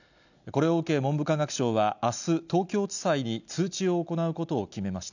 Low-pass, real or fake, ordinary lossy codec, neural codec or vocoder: 7.2 kHz; real; none; none